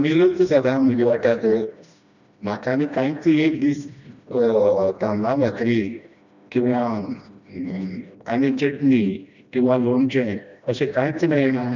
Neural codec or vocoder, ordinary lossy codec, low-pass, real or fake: codec, 16 kHz, 1 kbps, FreqCodec, smaller model; none; 7.2 kHz; fake